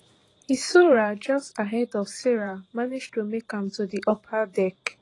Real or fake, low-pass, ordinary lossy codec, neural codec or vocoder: fake; 10.8 kHz; AAC, 32 kbps; vocoder, 44.1 kHz, 128 mel bands every 512 samples, BigVGAN v2